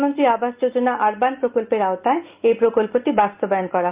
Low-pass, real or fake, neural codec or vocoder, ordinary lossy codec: 3.6 kHz; real; none; Opus, 32 kbps